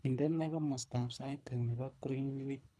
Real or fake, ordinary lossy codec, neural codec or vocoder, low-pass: fake; none; codec, 24 kHz, 3 kbps, HILCodec; none